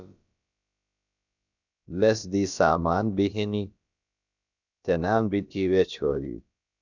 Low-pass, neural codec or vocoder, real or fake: 7.2 kHz; codec, 16 kHz, about 1 kbps, DyCAST, with the encoder's durations; fake